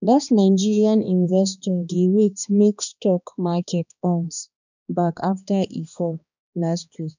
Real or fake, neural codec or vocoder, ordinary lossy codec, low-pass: fake; codec, 16 kHz, 2 kbps, X-Codec, HuBERT features, trained on balanced general audio; none; 7.2 kHz